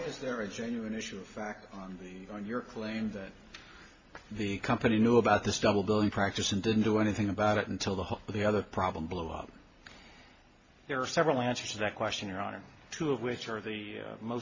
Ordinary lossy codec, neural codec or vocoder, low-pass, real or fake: MP3, 32 kbps; none; 7.2 kHz; real